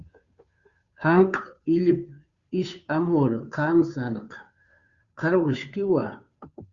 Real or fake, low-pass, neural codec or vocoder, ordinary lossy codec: fake; 7.2 kHz; codec, 16 kHz, 2 kbps, FunCodec, trained on Chinese and English, 25 frames a second; Opus, 64 kbps